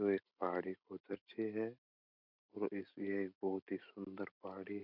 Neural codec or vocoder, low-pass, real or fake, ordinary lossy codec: vocoder, 44.1 kHz, 128 mel bands every 256 samples, BigVGAN v2; 5.4 kHz; fake; none